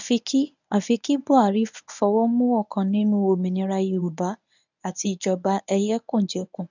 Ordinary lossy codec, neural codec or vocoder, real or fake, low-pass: none; codec, 24 kHz, 0.9 kbps, WavTokenizer, medium speech release version 1; fake; 7.2 kHz